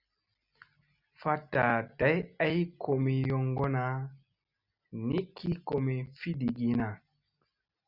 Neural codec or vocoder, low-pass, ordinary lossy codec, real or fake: none; 5.4 kHz; Opus, 64 kbps; real